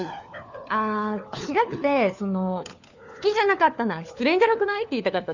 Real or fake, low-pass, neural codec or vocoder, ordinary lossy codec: fake; 7.2 kHz; codec, 16 kHz, 4 kbps, FunCodec, trained on LibriTTS, 50 frames a second; none